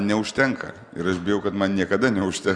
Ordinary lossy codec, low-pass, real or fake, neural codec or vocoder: Opus, 64 kbps; 9.9 kHz; real; none